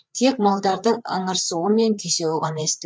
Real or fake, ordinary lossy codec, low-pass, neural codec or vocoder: fake; none; none; codec, 16 kHz, 4 kbps, FreqCodec, larger model